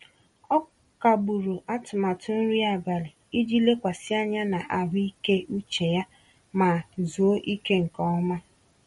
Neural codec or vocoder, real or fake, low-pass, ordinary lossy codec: none; real; 19.8 kHz; MP3, 48 kbps